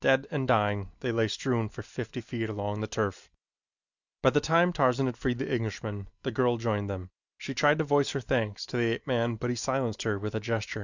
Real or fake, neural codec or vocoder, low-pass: real; none; 7.2 kHz